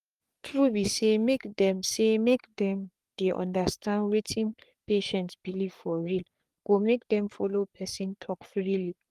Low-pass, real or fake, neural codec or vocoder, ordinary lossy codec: 14.4 kHz; fake; codec, 44.1 kHz, 3.4 kbps, Pupu-Codec; Opus, 32 kbps